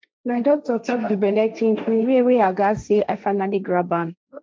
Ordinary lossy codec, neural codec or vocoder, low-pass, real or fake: none; codec, 16 kHz, 1.1 kbps, Voila-Tokenizer; none; fake